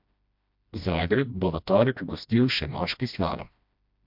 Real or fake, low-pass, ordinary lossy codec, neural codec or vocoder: fake; 5.4 kHz; MP3, 48 kbps; codec, 16 kHz, 1 kbps, FreqCodec, smaller model